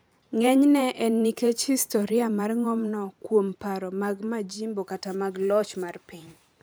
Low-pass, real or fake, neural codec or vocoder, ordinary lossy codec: none; fake; vocoder, 44.1 kHz, 128 mel bands every 512 samples, BigVGAN v2; none